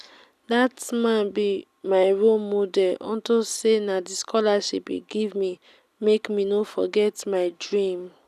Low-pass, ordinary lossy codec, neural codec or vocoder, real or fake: 14.4 kHz; none; none; real